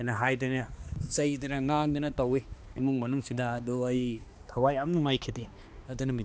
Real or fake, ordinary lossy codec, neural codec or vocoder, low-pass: fake; none; codec, 16 kHz, 2 kbps, X-Codec, HuBERT features, trained on balanced general audio; none